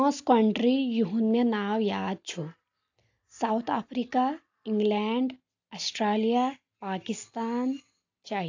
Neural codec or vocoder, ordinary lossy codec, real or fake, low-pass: none; AAC, 48 kbps; real; 7.2 kHz